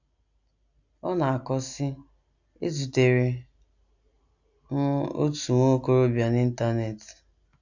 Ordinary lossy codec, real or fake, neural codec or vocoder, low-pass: none; real; none; 7.2 kHz